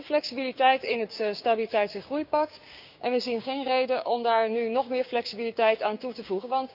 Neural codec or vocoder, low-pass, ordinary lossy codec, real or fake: codec, 16 kHz, 6 kbps, DAC; 5.4 kHz; none; fake